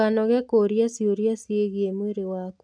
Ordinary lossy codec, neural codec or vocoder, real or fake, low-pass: none; none; real; 9.9 kHz